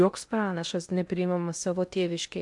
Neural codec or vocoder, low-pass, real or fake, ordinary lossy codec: codec, 16 kHz in and 24 kHz out, 0.6 kbps, FocalCodec, streaming, 2048 codes; 10.8 kHz; fake; MP3, 96 kbps